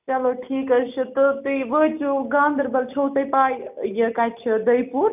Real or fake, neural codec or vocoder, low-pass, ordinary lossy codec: real; none; 3.6 kHz; none